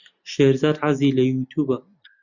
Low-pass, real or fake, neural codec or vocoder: 7.2 kHz; real; none